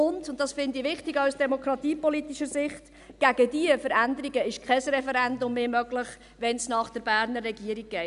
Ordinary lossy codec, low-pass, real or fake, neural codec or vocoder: none; 10.8 kHz; real; none